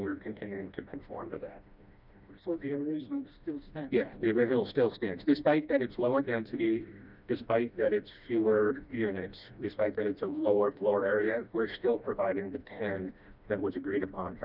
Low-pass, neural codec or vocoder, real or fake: 5.4 kHz; codec, 16 kHz, 1 kbps, FreqCodec, smaller model; fake